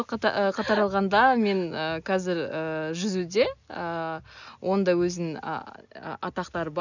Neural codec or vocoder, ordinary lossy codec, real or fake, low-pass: none; none; real; 7.2 kHz